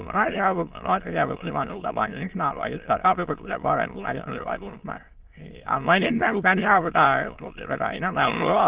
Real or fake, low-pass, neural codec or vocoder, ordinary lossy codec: fake; 3.6 kHz; autoencoder, 22.05 kHz, a latent of 192 numbers a frame, VITS, trained on many speakers; Opus, 16 kbps